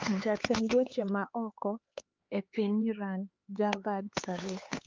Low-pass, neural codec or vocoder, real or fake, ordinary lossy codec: 7.2 kHz; codec, 16 kHz, 4 kbps, X-Codec, HuBERT features, trained on LibriSpeech; fake; Opus, 24 kbps